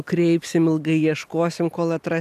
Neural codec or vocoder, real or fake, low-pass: none; real; 14.4 kHz